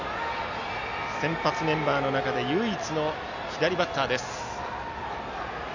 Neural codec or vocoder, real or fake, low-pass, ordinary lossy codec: none; real; 7.2 kHz; none